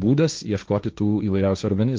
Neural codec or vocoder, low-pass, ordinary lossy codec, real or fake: codec, 16 kHz, 1.1 kbps, Voila-Tokenizer; 7.2 kHz; Opus, 24 kbps; fake